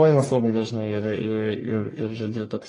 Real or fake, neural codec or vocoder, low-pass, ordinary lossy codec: fake; codec, 44.1 kHz, 1.7 kbps, Pupu-Codec; 10.8 kHz; AAC, 32 kbps